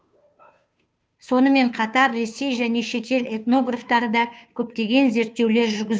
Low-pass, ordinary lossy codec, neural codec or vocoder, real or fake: none; none; codec, 16 kHz, 2 kbps, FunCodec, trained on Chinese and English, 25 frames a second; fake